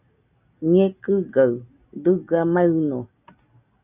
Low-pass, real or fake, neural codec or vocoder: 3.6 kHz; real; none